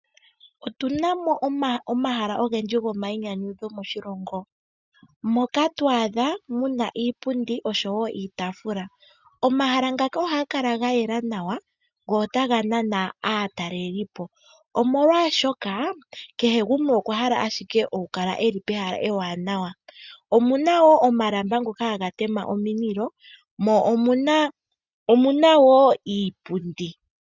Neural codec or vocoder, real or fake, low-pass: none; real; 7.2 kHz